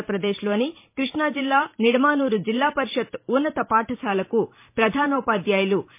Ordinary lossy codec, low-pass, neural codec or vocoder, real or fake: MP3, 24 kbps; 3.6 kHz; vocoder, 44.1 kHz, 128 mel bands every 512 samples, BigVGAN v2; fake